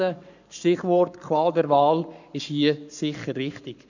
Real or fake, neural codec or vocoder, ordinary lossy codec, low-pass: fake; codec, 44.1 kHz, 7.8 kbps, Pupu-Codec; none; 7.2 kHz